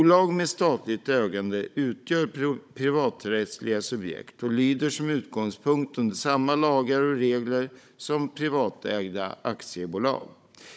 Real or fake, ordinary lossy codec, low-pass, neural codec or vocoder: fake; none; none; codec, 16 kHz, 16 kbps, FunCodec, trained on Chinese and English, 50 frames a second